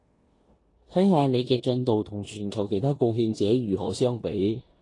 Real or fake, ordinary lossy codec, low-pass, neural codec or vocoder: fake; AAC, 32 kbps; 10.8 kHz; codec, 16 kHz in and 24 kHz out, 0.9 kbps, LongCat-Audio-Codec, four codebook decoder